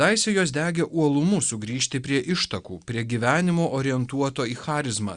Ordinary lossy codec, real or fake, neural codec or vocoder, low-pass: Opus, 64 kbps; real; none; 9.9 kHz